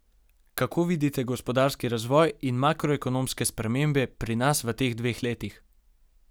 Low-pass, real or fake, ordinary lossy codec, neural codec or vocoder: none; real; none; none